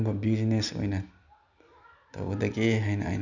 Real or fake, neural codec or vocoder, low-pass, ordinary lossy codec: real; none; 7.2 kHz; none